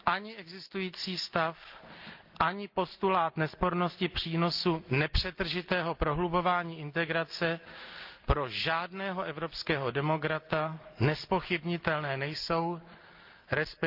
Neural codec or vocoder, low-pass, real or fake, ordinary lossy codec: none; 5.4 kHz; real; Opus, 24 kbps